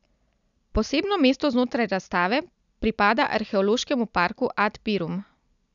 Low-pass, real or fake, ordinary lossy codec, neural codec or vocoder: 7.2 kHz; real; none; none